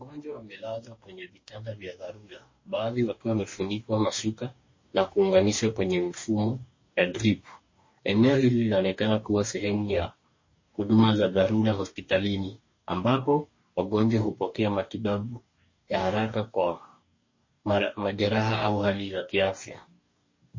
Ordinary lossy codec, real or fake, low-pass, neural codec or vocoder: MP3, 32 kbps; fake; 7.2 kHz; codec, 44.1 kHz, 2.6 kbps, DAC